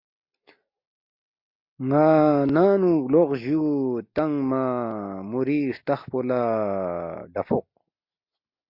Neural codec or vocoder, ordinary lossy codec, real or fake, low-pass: none; MP3, 32 kbps; real; 5.4 kHz